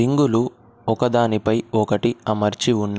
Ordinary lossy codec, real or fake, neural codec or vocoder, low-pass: none; real; none; none